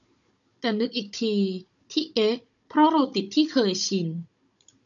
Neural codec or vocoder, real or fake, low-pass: codec, 16 kHz, 16 kbps, FunCodec, trained on Chinese and English, 50 frames a second; fake; 7.2 kHz